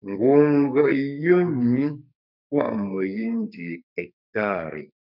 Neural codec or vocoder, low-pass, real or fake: codec, 44.1 kHz, 2.6 kbps, SNAC; 5.4 kHz; fake